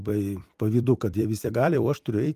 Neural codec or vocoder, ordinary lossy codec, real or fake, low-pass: vocoder, 44.1 kHz, 128 mel bands every 256 samples, BigVGAN v2; Opus, 32 kbps; fake; 14.4 kHz